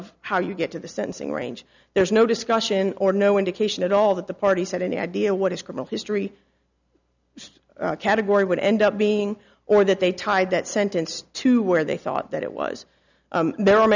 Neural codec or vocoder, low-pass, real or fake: none; 7.2 kHz; real